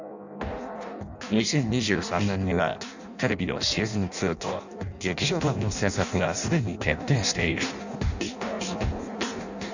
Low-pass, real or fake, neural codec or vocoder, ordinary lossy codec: 7.2 kHz; fake; codec, 16 kHz in and 24 kHz out, 0.6 kbps, FireRedTTS-2 codec; none